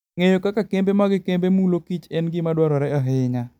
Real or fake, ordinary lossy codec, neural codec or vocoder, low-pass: real; none; none; 19.8 kHz